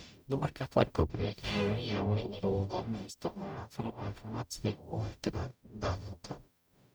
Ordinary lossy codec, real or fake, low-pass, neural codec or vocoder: none; fake; none; codec, 44.1 kHz, 0.9 kbps, DAC